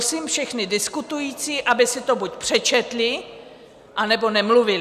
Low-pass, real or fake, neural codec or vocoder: 14.4 kHz; real; none